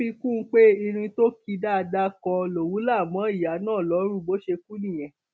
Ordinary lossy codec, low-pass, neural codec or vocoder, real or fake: none; none; none; real